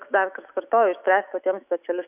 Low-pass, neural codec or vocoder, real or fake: 3.6 kHz; autoencoder, 48 kHz, 128 numbers a frame, DAC-VAE, trained on Japanese speech; fake